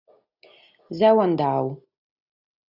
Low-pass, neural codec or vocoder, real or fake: 5.4 kHz; none; real